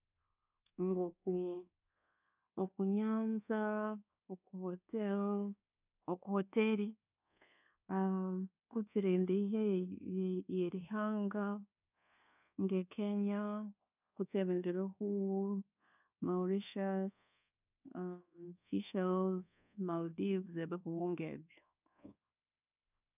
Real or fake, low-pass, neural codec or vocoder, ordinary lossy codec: fake; 3.6 kHz; codec, 24 kHz, 1.2 kbps, DualCodec; none